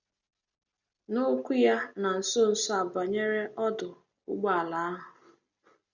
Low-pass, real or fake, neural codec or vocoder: 7.2 kHz; real; none